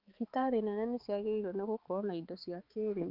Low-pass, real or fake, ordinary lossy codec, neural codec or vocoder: 5.4 kHz; fake; Opus, 32 kbps; codec, 16 kHz, 4 kbps, X-Codec, HuBERT features, trained on balanced general audio